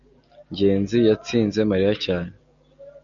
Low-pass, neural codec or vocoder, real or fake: 7.2 kHz; none; real